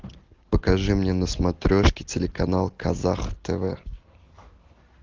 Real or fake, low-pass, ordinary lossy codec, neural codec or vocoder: real; 7.2 kHz; Opus, 16 kbps; none